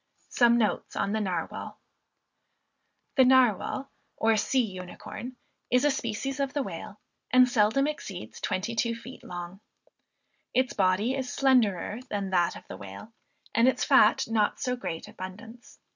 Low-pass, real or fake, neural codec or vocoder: 7.2 kHz; real; none